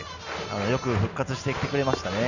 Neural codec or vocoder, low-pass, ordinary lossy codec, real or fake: none; 7.2 kHz; none; real